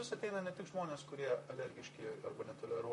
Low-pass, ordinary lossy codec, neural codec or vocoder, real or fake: 14.4 kHz; MP3, 48 kbps; vocoder, 44.1 kHz, 128 mel bands, Pupu-Vocoder; fake